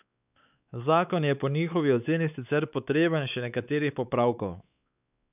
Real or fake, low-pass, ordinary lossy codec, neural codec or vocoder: fake; 3.6 kHz; none; codec, 16 kHz, 4 kbps, X-Codec, WavLM features, trained on Multilingual LibriSpeech